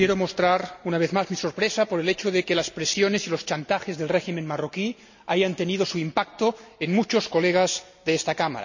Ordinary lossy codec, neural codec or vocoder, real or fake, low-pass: none; none; real; 7.2 kHz